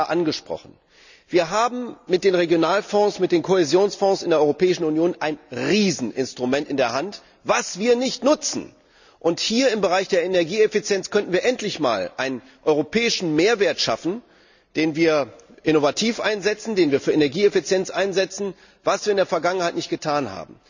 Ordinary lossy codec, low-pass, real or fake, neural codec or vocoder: none; 7.2 kHz; real; none